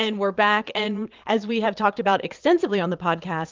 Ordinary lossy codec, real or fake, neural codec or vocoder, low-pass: Opus, 32 kbps; fake; vocoder, 22.05 kHz, 80 mel bands, Vocos; 7.2 kHz